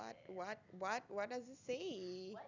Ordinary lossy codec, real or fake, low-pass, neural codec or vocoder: none; real; 7.2 kHz; none